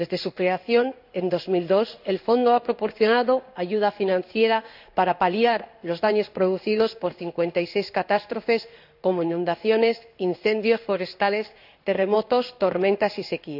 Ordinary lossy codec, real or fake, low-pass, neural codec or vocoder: none; fake; 5.4 kHz; codec, 16 kHz in and 24 kHz out, 1 kbps, XY-Tokenizer